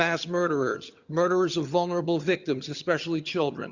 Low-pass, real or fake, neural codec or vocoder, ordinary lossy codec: 7.2 kHz; fake; vocoder, 22.05 kHz, 80 mel bands, HiFi-GAN; Opus, 64 kbps